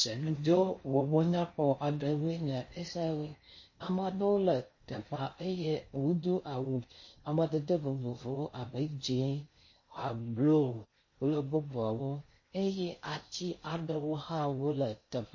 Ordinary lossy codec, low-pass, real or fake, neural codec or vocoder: MP3, 32 kbps; 7.2 kHz; fake; codec, 16 kHz in and 24 kHz out, 0.6 kbps, FocalCodec, streaming, 4096 codes